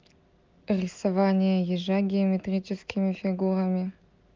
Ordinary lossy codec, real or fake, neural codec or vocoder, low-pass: Opus, 24 kbps; real; none; 7.2 kHz